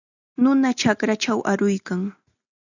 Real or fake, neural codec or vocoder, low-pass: real; none; 7.2 kHz